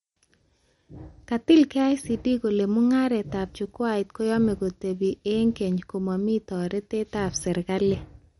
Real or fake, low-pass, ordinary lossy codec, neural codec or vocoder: real; 19.8 kHz; MP3, 48 kbps; none